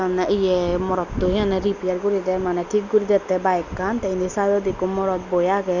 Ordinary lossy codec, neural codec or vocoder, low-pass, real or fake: none; none; 7.2 kHz; real